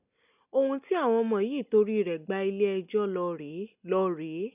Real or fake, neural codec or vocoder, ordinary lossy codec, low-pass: real; none; none; 3.6 kHz